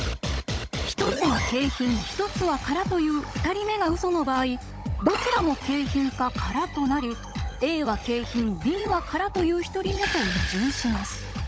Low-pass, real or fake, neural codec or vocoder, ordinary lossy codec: none; fake; codec, 16 kHz, 16 kbps, FunCodec, trained on Chinese and English, 50 frames a second; none